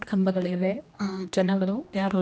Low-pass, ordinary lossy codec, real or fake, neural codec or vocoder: none; none; fake; codec, 16 kHz, 1 kbps, X-Codec, HuBERT features, trained on general audio